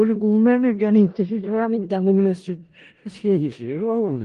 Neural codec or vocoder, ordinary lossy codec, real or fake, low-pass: codec, 16 kHz in and 24 kHz out, 0.4 kbps, LongCat-Audio-Codec, four codebook decoder; Opus, 24 kbps; fake; 10.8 kHz